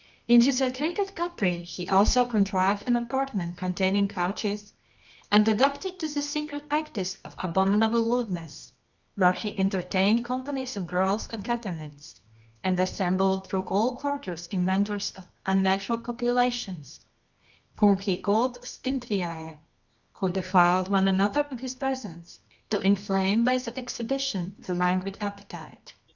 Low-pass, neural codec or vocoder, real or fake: 7.2 kHz; codec, 24 kHz, 0.9 kbps, WavTokenizer, medium music audio release; fake